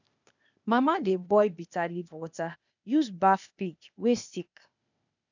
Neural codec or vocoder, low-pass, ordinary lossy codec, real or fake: codec, 16 kHz, 0.8 kbps, ZipCodec; 7.2 kHz; none; fake